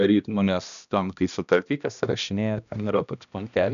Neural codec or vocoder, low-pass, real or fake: codec, 16 kHz, 1 kbps, X-Codec, HuBERT features, trained on balanced general audio; 7.2 kHz; fake